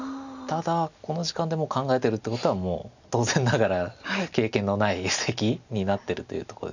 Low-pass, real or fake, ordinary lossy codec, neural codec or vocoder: 7.2 kHz; real; none; none